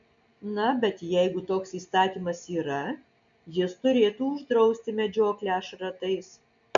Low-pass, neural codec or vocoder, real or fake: 7.2 kHz; none; real